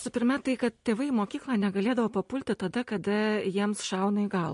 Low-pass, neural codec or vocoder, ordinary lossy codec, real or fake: 10.8 kHz; none; MP3, 48 kbps; real